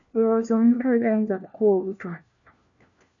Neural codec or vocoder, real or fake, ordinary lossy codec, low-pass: codec, 16 kHz, 1 kbps, FunCodec, trained on LibriTTS, 50 frames a second; fake; MP3, 96 kbps; 7.2 kHz